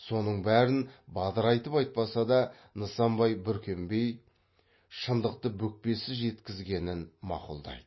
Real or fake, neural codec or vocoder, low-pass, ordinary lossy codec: real; none; 7.2 kHz; MP3, 24 kbps